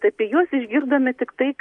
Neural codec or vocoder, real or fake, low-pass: none; real; 10.8 kHz